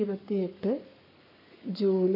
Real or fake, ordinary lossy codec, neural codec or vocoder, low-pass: fake; MP3, 48 kbps; codec, 44.1 kHz, 7.8 kbps, Pupu-Codec; 5.4 kHz